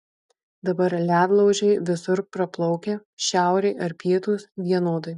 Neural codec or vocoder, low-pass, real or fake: none; 10.8 kHz; real